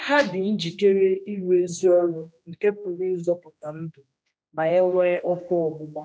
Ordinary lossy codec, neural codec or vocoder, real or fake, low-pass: none; codec, 16 kHz, 1 kbps, X-Codec, HuBERT features, trained on general audio; fake; none